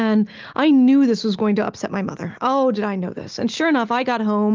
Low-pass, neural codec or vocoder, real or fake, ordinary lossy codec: 7.2 kHz; none; real; Opus, 32 kbps